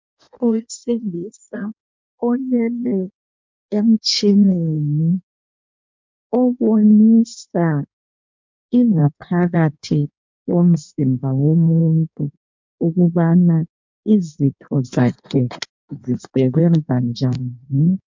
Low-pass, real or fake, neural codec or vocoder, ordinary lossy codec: 7.2 kHz; fake; codec, 16 kHz in and 24 kHz out, 1.1 kbps, FireRedTTS-2 codec; MP3, 64 kbps